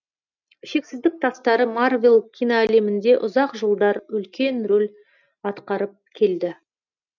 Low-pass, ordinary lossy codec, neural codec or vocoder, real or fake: 7.2 kHz; none; none; real